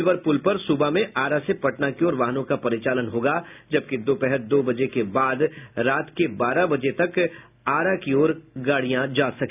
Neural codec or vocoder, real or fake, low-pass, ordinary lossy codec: none; real; 3.6 kHz; none